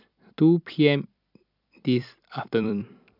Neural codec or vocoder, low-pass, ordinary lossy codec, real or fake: vocoder, 44.1 kHz, 128 mel bands every 256 samples, BigVGAN v2; 5.4 kHz; none; fake